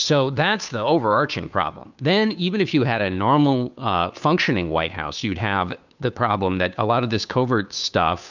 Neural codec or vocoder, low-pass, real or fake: codec, 16 kHz, 2 kbps, FunCodec, trained on Chinese and English, 25 frames a second; 7.2 kHz; fake